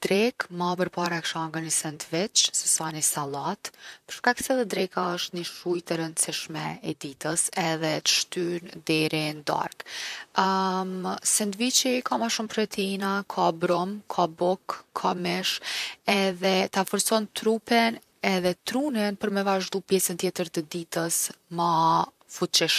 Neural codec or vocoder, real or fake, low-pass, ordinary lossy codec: vocoder, 44.1 kHz, 128 mel bands, Pupu-Vocoder; fake; 14.4 kHz; none